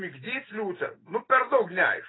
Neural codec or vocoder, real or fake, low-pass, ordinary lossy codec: none; real; 7.2 kHz; AAC, 16 kbps